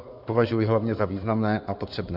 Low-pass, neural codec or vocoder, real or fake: 5.4 kHz; codec, 16 kHz in and 24 kHz out, 2.2 kbps, FireRedTTS-2 codec; fake